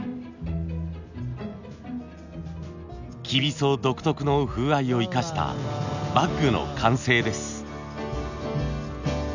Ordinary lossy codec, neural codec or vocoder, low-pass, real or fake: none; none; 7.2 kHz; real